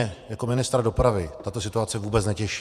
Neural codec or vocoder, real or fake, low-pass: vocoder, 48 kHz, 128 mel bands, Vocos; fake; 14.4 kHz